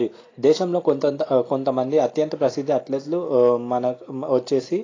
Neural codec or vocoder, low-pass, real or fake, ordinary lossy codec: none; 7.2 kHz; real; AAC, 32 kbps